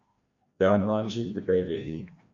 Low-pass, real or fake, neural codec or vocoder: 7.2 kHz; fake; codec, 16 kHz, 1 kbps, FreqCodec, larger model